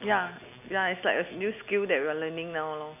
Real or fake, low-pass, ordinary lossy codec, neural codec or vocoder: real; 3.6 kHz; none; none